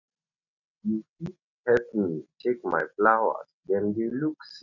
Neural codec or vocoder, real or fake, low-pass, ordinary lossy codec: none; real; 7.2 kHz; none